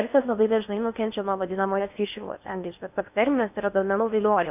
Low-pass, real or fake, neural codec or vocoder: 3.6 kHz; fake; codec, 16 kHz in and 24 kHz out, 0.6 kbps, FocalCodec, streaming, 4096 codes